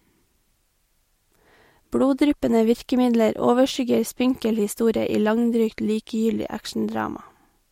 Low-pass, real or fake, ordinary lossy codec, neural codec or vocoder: 19.8 kHz; real; MP3, 64 kbps; none